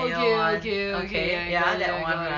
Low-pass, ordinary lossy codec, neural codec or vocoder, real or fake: 7.2 kHz; none; none; real